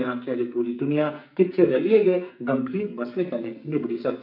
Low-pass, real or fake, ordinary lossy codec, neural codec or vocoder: 5.4 kHz; fake; none; codec, 44.1 kHz, 2.6 kbps, SNAC